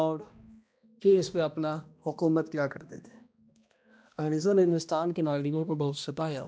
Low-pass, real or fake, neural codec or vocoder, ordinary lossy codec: none; fake; codec, 16 kHz, 1 kbps, X-Codec, HuBERT features, trained on balanced general audio; none